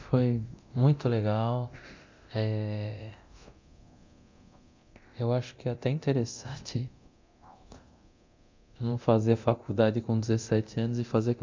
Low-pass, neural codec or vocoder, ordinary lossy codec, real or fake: 7.2 kHz; codec, 24 kHz, 0.9 kbps, DualCodec; MP3, 64 kbps; fake